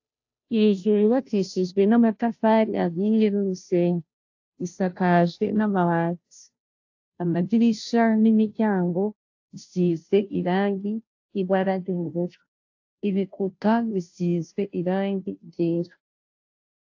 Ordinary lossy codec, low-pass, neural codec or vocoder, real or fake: AAC, 48 kbps; 7.2 kHz; codec, 16 kHz, 0.5 kbps, FunCodec, trained on Chinese and English, 25 frames a second; fake